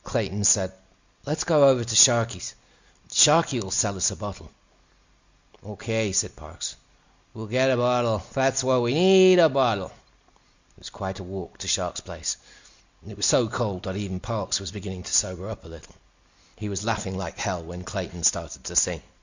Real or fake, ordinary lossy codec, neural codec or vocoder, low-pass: real; Opus, 64 kbps; none; 7.2 kHz